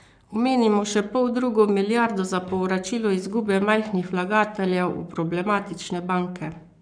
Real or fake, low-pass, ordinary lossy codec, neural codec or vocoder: fake; 9.9 kHz; none; codec, 44.1 kHz, 7.8 kbps, Pupu-Codec